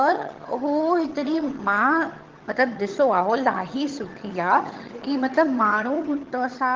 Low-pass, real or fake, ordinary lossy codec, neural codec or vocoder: 7.2 kHz; fake; Opus, 16 kbps; vocoder, 22.05 kHz, 80 mel bands, HiFi-GAN